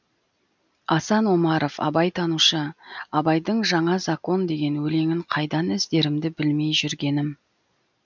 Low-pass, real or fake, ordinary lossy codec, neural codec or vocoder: 7.2 kHz; real; none; none